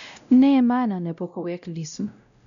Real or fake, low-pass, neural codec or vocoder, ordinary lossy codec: fake; 7.2 kHz; codec, 16 kHz, 0.5 kbps, X-Codec, WavLM features, trained on Multilingual LibriSpeech; none